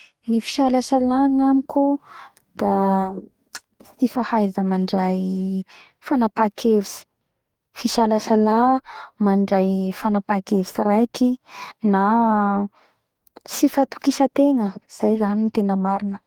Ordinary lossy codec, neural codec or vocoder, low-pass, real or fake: Opus, 32 kbps; codec, 44.1 kHz, 2.6 kbps, DAC; 19.8 kHz; fake